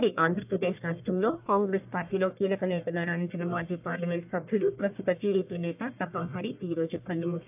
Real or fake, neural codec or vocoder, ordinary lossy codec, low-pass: fake; codec, 44.1 kHz, 1.7 kbps, Pupu-Codec; AAC, 32 kbps; 3.6 kHz